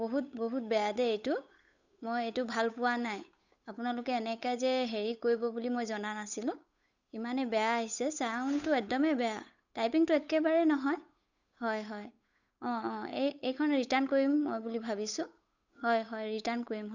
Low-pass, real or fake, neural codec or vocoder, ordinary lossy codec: 7.2 kHz; fake; codec, 16 kHz, 8 kbps, FunCodec, trained on Chinese and English, 25 frames a second; none